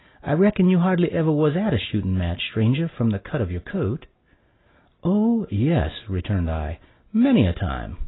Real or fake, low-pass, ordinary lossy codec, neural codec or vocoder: real; 7.2 kHz; AAC, 16 kbps; none